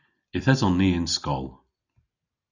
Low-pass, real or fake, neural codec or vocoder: 7.2 kHz; real; none